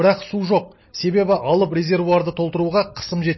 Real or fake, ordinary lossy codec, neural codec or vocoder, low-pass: real; MP3, 24 kbps; none; 7.2 kHz